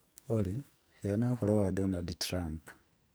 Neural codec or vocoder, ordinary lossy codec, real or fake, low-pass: codec, 44.1 kHz, 2.6 kbps, SNAC; none; fake; none